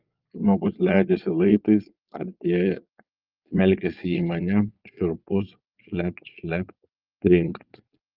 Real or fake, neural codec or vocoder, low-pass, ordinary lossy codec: fake; vocoder, 22.05 kHz, 80 mel bands, Vocos; 5.4 kHz; Opus, 24 kbps